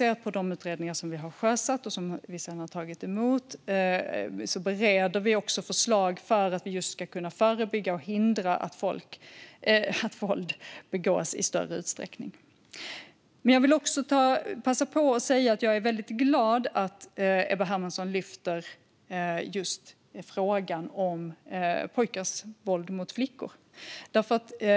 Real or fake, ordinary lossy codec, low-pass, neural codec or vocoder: real; none; none; none